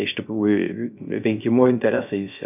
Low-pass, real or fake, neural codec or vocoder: 3.6 kHz; fake; codec, 16 kHz, 0.3 kbps, FocalCodec